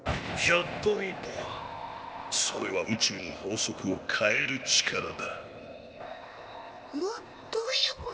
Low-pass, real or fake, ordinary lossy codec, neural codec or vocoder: none; fake; none; codec, 16 kHz, 0.8 kbps, ZipCodec